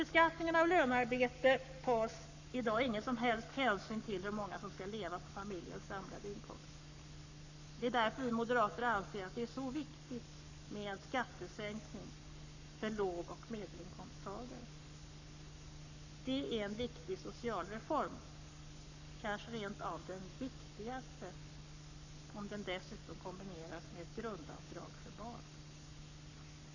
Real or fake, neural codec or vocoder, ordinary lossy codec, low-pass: fake; codec, 44.1 kHz, 7.8 kbps, Pupu-Codec; none; 7.2 kHz